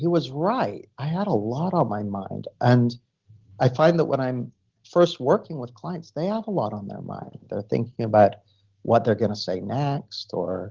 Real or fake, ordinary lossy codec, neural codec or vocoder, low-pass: real; Opus, 16 kbps; none; 7.2 kHz